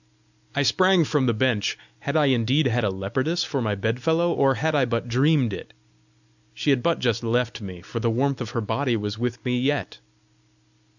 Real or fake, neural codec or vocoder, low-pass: real; none; 7.2 kHz